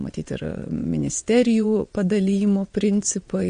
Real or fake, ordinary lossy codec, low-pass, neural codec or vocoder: fake; MP3, 48 kbps; 9.9 kHz; vocoder, 22.05 kHz, 80 mel bands, WaveNeXt